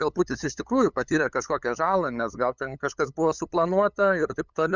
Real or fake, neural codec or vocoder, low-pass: fake; codec, 16 kHz, 8 kbps, FunCodec, trained on LibriTTS, 25 frames a second; 7.2 kHz